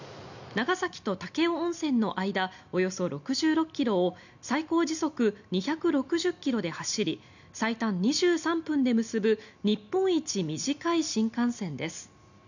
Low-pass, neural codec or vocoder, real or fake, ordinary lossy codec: 7.2 kHz; none; real; none